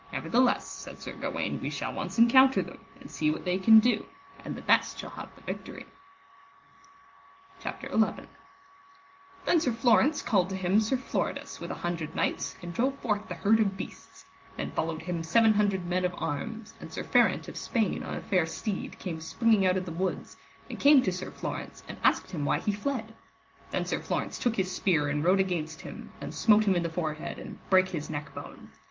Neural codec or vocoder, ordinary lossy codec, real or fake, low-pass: none; Opus, 24 kbps; real; 7.2 kHz